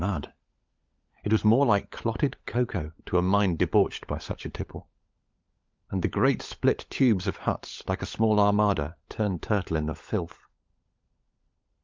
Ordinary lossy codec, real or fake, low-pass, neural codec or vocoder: Opus, 24 kbps; fake; 7.2 kHz; codec, 16 kHz, 16 kbps, FunCodec, trained on LibriTTS, 50 frames a second